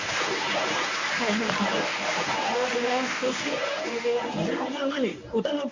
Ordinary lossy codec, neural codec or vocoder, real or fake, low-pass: AAC, 48 kbps; codec, 24 kHz, 0.9 kbps, WavTokenizer, medium speech release version 1; fake; 7.2 kHz